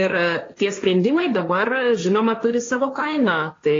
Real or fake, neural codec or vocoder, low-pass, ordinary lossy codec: fake; codec, 16 kHz, 1.1 kbps, Voila-Tokenizer; 7.2 kHz; AAC, 32 kbps